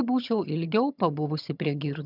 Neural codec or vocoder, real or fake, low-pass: vocoder, 22.05 kHz, 80 mel bands, HiFi-GAN; fake; 5.4 kHz